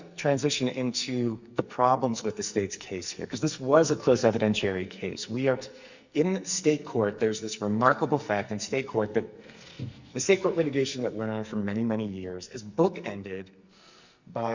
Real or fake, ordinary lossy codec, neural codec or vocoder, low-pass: fake; Opus, 64 kbps; codec, 44.1 kHz, 2.6 kbps, SNAC; 7.2 kHz